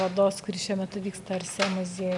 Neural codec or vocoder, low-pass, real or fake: none; 10.8 kHz; real